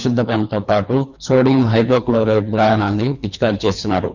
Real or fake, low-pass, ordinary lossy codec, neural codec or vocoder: fake; 7.2 kHz; none; codec, 24 kHz, 3 kbps, HILCodec